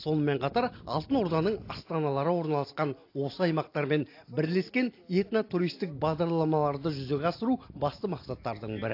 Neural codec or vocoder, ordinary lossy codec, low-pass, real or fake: none; AAC, 32 kbps; 5.4 kHz; real